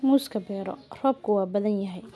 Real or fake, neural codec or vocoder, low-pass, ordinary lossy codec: real; none; none; none